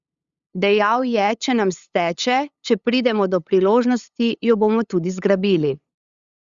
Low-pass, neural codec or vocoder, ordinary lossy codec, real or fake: 7.2 kHz; codec, 16 kHz, 8 kbps, FunCodec, trained on LibriTTS, 25 frames a second; Opus, 64 kbps; fake